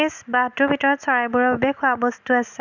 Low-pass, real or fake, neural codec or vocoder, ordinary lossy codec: 7.2 kHz; real; none; none